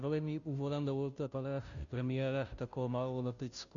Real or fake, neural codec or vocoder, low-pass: fake; codec, 16 kHz, 0.5 kbps, FunCodec, trained on Chinese and English, 25 frames a second; 7.2 kHz